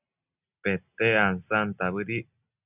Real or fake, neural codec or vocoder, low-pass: real; none; 3.6 kHz